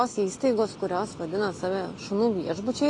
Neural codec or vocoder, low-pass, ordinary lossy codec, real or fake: none; 10.8 kHz; AAC, 32 kbps; real